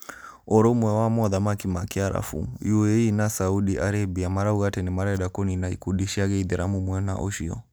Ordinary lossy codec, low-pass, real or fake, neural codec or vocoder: none; none; real; none